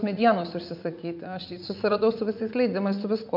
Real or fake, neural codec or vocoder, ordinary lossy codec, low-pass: fake; vocoder, 44.1 kHz, 80 mel bands, Vocos; MP3, 48 kbps; 5.4 kHz